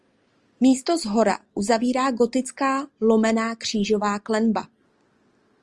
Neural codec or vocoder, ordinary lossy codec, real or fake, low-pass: none; Opus, 24 kbps; real; 10.8 kHz